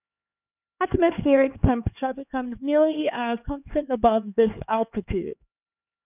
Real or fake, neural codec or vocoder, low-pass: fake; codec, 16 kHz, 2 kbps, X-Codec, HuBERT features, trained on LibriSpeech; 3.6 kHz